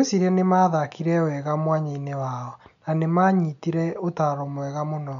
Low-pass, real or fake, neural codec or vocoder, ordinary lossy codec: 7.2 kHz; real; none; none